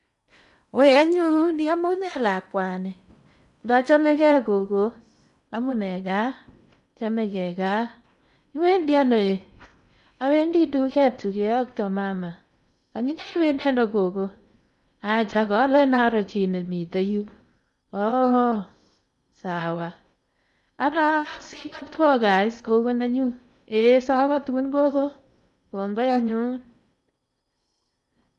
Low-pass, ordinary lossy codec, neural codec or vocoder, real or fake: 10.8 kHz; none; codec, 16 kHz in and 24 kHz out, 0.8 kbps, FocalCodec, streaming, 65536 codes; fake